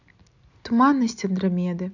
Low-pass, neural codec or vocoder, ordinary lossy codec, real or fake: 7.2 kHz; none; none; real